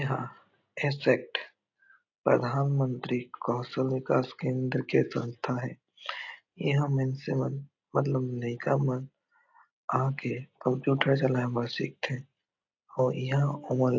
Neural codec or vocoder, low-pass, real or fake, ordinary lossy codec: none; 7.2 kHz; real; AAC, 48 kbps